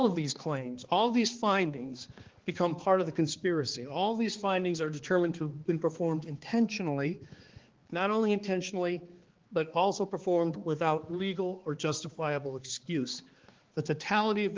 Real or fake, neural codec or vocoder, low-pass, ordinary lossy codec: fake; codec, 16 kHz, 2 kbps, X-Codec, HuBERT features, trained on balanced general audio; 7.2 kHz; Opus, 16 kbps